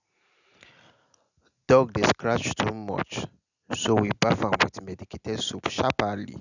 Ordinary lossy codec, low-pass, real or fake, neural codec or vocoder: none; 7.2 kHz; real; none